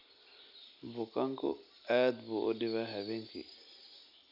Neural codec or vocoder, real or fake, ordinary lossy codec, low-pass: none; real; none; 5.4 kHz